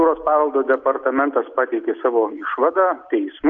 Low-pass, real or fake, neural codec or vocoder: 7.2 kHz; real; none